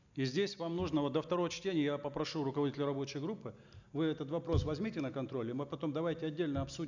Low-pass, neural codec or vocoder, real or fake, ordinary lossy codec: 7.2 kHz; none; real; none